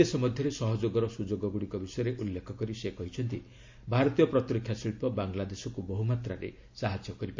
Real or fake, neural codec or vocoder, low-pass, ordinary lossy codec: real; none; 7.2 kHz; MP3, 48 kbps